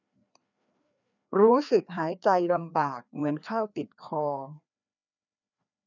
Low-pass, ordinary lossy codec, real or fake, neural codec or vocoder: 7.2 kHz; none; fake; codec, 16 kHz, 2 kbps, FreqCodec, larger model